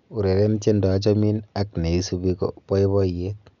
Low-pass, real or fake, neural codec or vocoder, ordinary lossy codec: 7.2 kHz; real; none; none